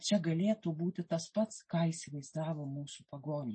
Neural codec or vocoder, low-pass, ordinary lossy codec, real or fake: none; 9.9 kHz; MP3, 32 kbps; real